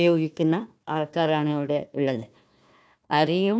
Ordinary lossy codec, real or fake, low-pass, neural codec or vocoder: none; fake; none; codec, 16 kHz, 1 kbps, FunCodec, trained on Chinese and English, 50 frames a second